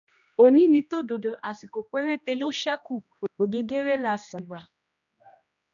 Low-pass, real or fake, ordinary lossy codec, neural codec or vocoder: 7.2 kHz; fake; none; codec, 16 kHz, 1 kbps, X-Codec, HuBERT features, trained on general audio